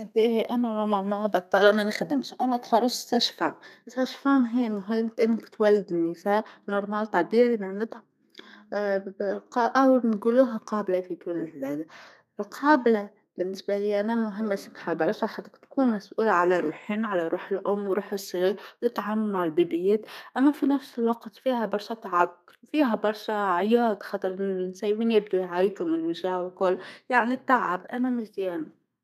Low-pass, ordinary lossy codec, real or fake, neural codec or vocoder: 14.4 kHz; none; fake; codec, 32 kHz, 1.9 kbps, SNAC